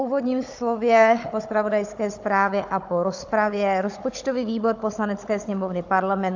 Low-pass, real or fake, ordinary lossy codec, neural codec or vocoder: 7.2 kHz; fake; AAC, 48 kbps; codec, 16 kHz, 16 kbps, FunCodec, trained on Chinese and English, 50 frames a second